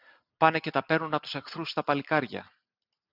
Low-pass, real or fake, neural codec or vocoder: 5.4 kHz; real; none